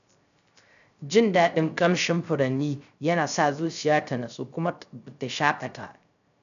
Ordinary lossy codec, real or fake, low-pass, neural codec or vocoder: none; fake; 7.2 kHz; codec, 16 kHz, 0.3 kbps, FocalCodec